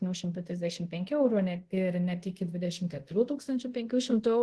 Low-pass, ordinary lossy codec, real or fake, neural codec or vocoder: 10.8 kHz; Opus, 16 kbps; fake; codec, 24 kHz, 0.5 kbps, DualCodec